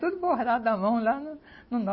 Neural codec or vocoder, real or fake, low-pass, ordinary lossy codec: none; real; 7.2 kHz; MP3, 24 kbps